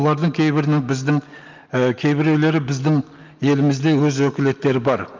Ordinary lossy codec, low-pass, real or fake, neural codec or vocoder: Opus, 24 kbps; 7.2 kHz; real; none